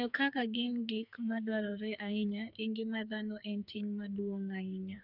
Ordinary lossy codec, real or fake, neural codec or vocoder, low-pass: none; fake; codec, 44.1 kHz, 2.6 kbps, SNAC; 5.4 kHz